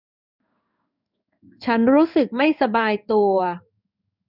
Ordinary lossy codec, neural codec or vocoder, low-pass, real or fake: none; codec, 16 kHz in and 24 kHz out, 1 kbps, XY-Tokenizer; 5.4 kHz; fake